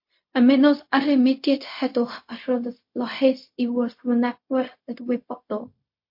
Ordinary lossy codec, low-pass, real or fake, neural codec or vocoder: MP3, 32 kbps; 5.4 kHz; fake; codec, 16 kHz, 0.4 kbps, LongCat-Audio-Codec